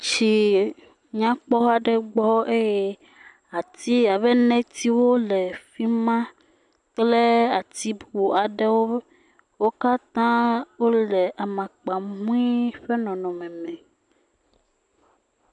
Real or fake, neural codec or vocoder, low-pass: real; none; 10.8 kHz